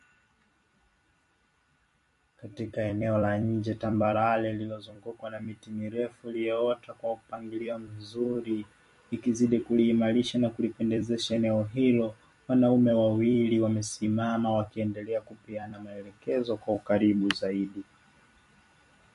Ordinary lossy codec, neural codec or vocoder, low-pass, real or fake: MP3, 48 kbps; vocoder, 44.1 kHz, 128 mel bands every 256 samples, BigVGAN v2; 14.4 kHz; fake